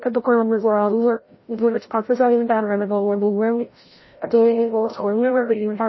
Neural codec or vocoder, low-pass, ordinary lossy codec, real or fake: codec, 16 kHz, 0.5 kbps, FreqCodec, larger model; 7.2 kHz; MP3, 24 kbps; fake